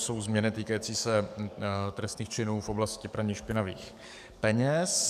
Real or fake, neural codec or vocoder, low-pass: fake; codec, 44.1 kHz, 7.8 kbps, DAC; 14.4 kHz